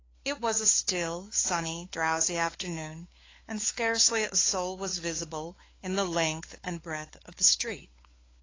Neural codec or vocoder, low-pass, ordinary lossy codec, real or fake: codec, 16 kHz, 4 kbps, FunCodec, trained on LibriTTS, 50 frames a second; 7.2 kHz; AAC, 32 kbps; fake